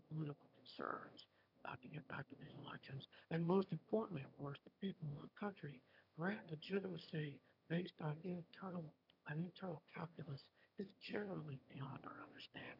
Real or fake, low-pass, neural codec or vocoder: fake; 5.4 kHz; autoencoder, 22.05 kHz, a latent of 192 numbers a frame, VITS, trained on one speaker